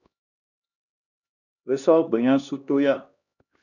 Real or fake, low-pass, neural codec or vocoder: fake; 7.2 kHz; codec, 16 kHz, 1 kbps, X-Codec, HuBERT features, trained on LibriSpeech